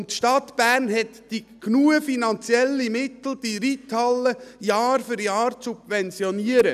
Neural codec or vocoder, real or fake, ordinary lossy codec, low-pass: none; real; none; 14.4 kHz